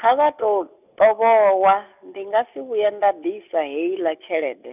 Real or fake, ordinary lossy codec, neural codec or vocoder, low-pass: real; none; none; 3.6 kHz